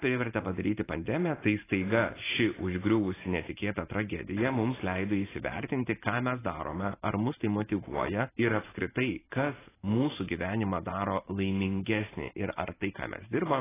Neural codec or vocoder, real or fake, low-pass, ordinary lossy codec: vocoder, 44.1 kHz, 128 mel bands every 512 samples, BigVGAN v2; fake; 3.6 kHz; AAC, 16 kbps